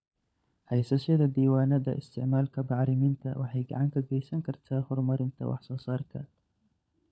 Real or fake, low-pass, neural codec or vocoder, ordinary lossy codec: fake; none; codec, 16 kHz, 16 kbps, FunCodec, trained on LibriTTS, 50 frames a second; none